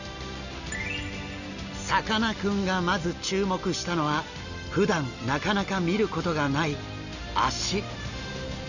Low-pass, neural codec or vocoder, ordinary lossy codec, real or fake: 7.2 kHz; none; none; real